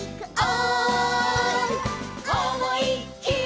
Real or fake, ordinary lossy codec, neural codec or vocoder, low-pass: real; none; none; none